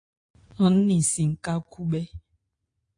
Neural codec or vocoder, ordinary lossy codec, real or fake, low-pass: none; AAC, 48 kbps; real; 9.9 kHz